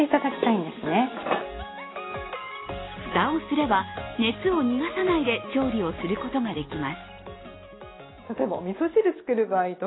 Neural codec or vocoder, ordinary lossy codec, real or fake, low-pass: none; AAC, 16 kbps; real; 7.2 kHz